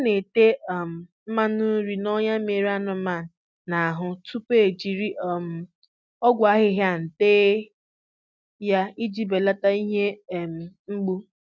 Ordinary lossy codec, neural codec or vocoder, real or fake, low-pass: none; none; real; none